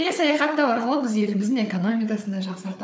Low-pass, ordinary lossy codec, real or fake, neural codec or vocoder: none; none; fake; codec, 16 kHz, 4 kbps, FunCodec, trained on Chinese and English, 50 frames a second